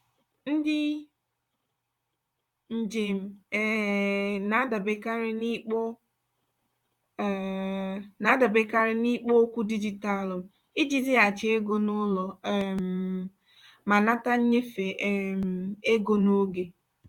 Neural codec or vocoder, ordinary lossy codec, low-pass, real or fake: vocoder, 44.1 kHz, 128 mel bands, Pupu-Vocoder; none; 19.8 kHz; fake